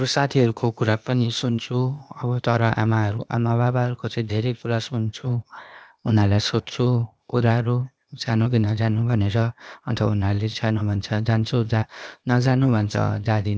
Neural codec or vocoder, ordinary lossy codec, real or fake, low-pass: codec, 16 kHz, 0.8 kbps, ZipCodec; none; fake; none